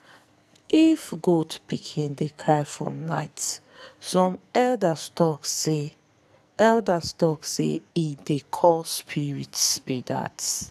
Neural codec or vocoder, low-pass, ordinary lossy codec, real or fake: codec, 44.1 kHz, 2.6 kbps, SNAC; 14.4 kHz; none; fake